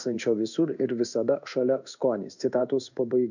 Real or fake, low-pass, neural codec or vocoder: fake; 7.2 kHz; codec, 16 kHz in and 24 kHz out, 1 kbps, XY-Tokenizer